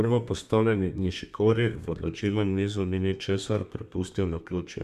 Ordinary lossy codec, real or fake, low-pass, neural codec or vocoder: none; fake; 14.4 kHz; codec, 32 kHz, 1.9 kbps, SNAC